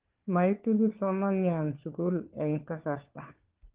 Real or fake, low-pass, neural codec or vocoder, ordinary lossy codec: fake; 3.6 kHz; codec, 16 kHz, 4 kbps, FunCodec, trained on LibriTTS, 50 frames a second; Opus, 16 kbps